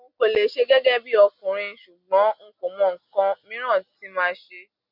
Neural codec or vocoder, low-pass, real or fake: none; 5.4 kHz; real